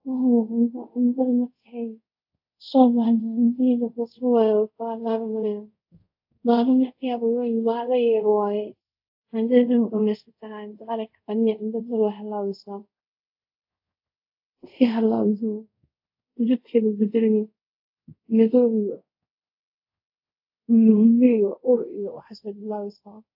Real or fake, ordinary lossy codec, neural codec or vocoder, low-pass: fake; AAC, 48 kbps; codec, 24 kHz, 0.5 kbps, DualCodec; 5.4 kHz